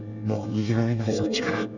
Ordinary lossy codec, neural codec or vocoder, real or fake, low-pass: none; codec, 24 kHz, 1 kbps, SNAC; fake; 7.2 kHz